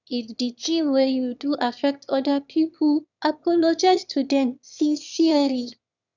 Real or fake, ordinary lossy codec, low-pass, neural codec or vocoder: fake; none; 7.2 kHz; autoencoder, 22.05 kHz, a latent of 192 numbers a frame, VITS, trained on one speaker